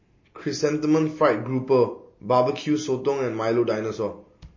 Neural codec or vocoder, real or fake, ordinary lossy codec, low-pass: none; real; MP3, 32 kbps; 7.2 kHz